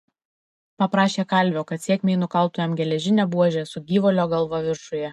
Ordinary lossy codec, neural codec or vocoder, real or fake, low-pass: MP3, 64 kbps; none; real; 14.4 kHz